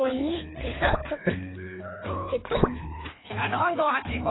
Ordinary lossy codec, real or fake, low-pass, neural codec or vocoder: AAC, 16 kbps; fake; 7.2 kHz; codec, 24 kHz, 3 kbps, HILCodec